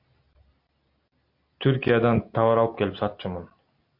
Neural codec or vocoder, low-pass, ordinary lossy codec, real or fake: none; 5.4 kHz; MP3, 32 kbps; real